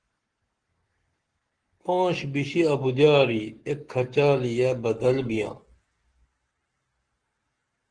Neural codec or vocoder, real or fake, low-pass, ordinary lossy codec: codec, 44.1 kHz, 7.8 kbps, Pupu-Codec; fake; 9.9 kHz; Opus, 16 kbps